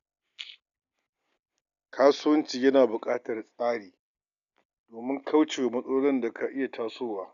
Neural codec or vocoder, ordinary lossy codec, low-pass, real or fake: none; none; 7.2 kHz; real